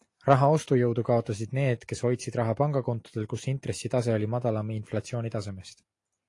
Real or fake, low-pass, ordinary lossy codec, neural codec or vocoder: real; 10.8 kHz; AAC, 48 kbps; none